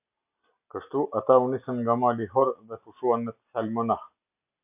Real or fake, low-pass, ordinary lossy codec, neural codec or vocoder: real; 3.6 kHz; AAC, 32 kbps; none